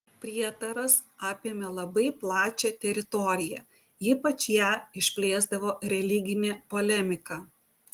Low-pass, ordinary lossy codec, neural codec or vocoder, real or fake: 19.8 kHz; Opus, 24 kbps; none; real